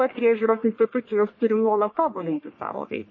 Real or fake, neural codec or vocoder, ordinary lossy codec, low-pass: fake; codec, 44.1 kHz, 1.7 kbps, Pupu-Codec; MP3, 32 kbps; 7.2 kHz